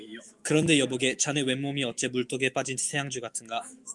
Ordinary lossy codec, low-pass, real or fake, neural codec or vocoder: Opus, 32 kbps; 10.8 kHz; fake; autoencoder, 48 kHz, 128 numbers a frame, DAC-VAE, trained on Japanese speech